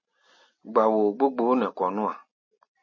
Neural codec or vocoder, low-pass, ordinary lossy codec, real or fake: none; 7.2 kHz; MP3, 32 kbps; real